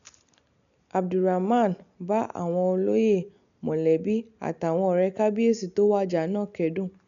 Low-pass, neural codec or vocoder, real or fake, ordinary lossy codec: 7.2 kHz; none; real; none